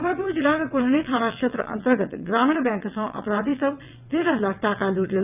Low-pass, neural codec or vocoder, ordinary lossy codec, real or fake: 3.6 kHz; vocoder, 22.05 kHz, 80 mel bands, WaveNeXt; none; fake